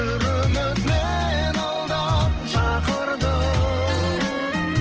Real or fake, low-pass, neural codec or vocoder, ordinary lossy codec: real; 7.2 kHz; none; Opus, 16 kbps